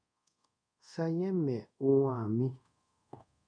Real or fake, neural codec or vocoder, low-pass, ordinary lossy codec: fake; codec, 24 kHz, 0.5 kbps, DualCodec; 9.9 kHz; MP3, 96 kbps